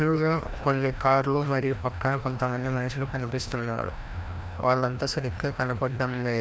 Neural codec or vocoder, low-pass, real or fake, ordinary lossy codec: codec, 16 kHz, 1 kbps, FreqCodec, larger model; none; fake; none